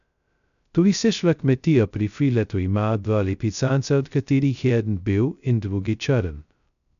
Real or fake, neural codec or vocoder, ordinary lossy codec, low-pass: fake; codec, 16 kHz, 0.2 kbps, FocalCodec; none; 7.2 kHz